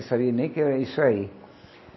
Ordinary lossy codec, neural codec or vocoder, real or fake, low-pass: MP3, 24 kbps; none; real; 7.2 kHz